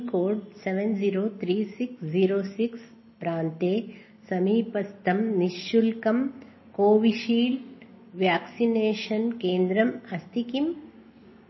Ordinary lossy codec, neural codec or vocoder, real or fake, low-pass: MP3, 24 kbps; none; real; 7.2 kHz